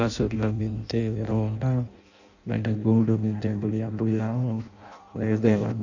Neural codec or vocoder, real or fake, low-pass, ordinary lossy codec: codec, 16 kHz in and 24 kHz out, 0.6 kbps, FireRedTTS-2 codec; fake; 7.2 kHz; none